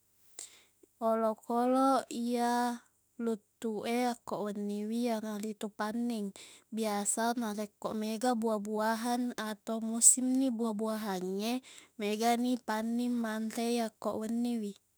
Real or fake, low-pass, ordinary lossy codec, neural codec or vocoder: fake; none; none; autoencoder, 48 kHz, 32 numbers a frame, DAC-VAE, trained on Japanese speech